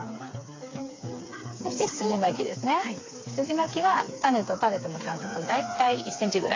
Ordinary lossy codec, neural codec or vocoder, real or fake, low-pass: AAC, 32 kbps; codec, 16 kHz, 4 kbps, FreqCodec, smaller model; fake; 7.2 kHz